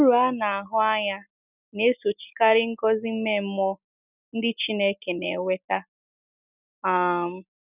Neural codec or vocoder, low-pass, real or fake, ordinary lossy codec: none; 3.6 kHz; real; none